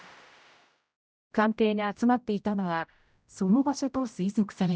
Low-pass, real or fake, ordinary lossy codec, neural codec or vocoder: none; fake; none; codec, 16 kHz, 0.5 kbps, X-Codec, HuBERT features, trained on general audio